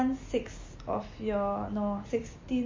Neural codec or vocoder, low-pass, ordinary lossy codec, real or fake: none; 7.2 kHz; MP3, 32 kbps; real